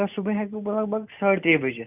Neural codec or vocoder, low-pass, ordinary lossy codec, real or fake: none; 3.6 kHz; none; real